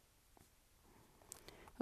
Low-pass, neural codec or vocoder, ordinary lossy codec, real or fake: 14.4 kHz; vocoder, 44.1 kHz, 128 mel bands every 256 samples, BigVGAN v2; AAC, 96 kbps; fake